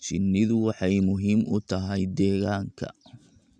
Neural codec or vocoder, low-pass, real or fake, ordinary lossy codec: none; 9.9 kHz; real; none